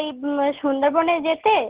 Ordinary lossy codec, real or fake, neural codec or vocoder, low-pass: Opus, 16 kbps; real; none; 3.6 kHz